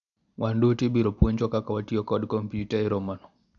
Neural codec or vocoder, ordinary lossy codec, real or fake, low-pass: none; none; real; 7.2 kHz